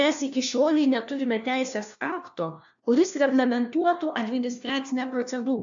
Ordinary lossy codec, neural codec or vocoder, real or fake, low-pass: AAC, 64 kbps; codec, 16 kHz, 1 kbps, FunCodec, trained on LibriTTS, 50 frames a second; fake; 7.2 kHz